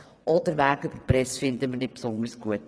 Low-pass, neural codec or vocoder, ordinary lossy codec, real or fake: none; vocoder, 22.05 kHz, 80 mel bands, WaveNeXt; none; fake